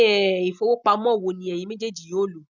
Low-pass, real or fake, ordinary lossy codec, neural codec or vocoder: 7.2 kHz; real; none; none